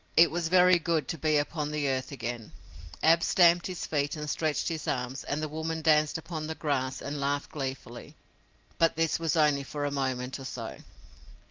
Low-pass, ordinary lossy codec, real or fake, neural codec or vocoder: 7.2 kHz; Opus, 24 kbps; real; none